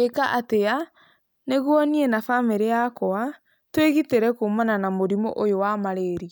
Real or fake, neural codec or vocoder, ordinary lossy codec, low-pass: real; none; none; none